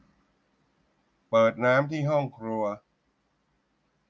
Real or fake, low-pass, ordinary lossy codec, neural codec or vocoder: real; none; none; none